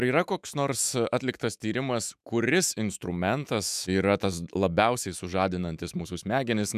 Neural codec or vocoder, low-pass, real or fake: autoencoder, 48 kHz, 128 numbers a frame, DAC-VAE, trained on Japanese speech; 14.4 kHz; fake